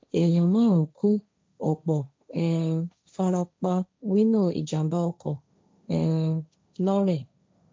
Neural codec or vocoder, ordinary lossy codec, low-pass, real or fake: codec, 16 kHz, 1.1 kbps, Voila-Tokenizer; none; none; fake